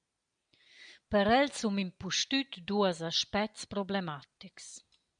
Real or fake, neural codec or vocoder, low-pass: real; none; 9.9 kHz